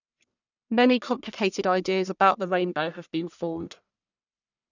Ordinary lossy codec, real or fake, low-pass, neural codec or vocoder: none; fake; 7.2 kHz; codec, 44.1 kHz, 1.7 kbps, Pupu-Codec